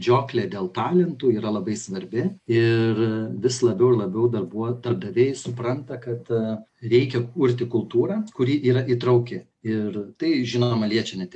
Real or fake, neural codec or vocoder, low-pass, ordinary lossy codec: real; none; 10.8 kHz; AAC, 64 kbps